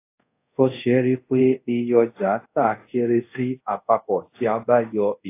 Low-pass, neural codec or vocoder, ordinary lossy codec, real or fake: 3.6 kHz; codec, 24 kHz, 0.5 kbps, DualCodec; AAC, 24 kbps; fake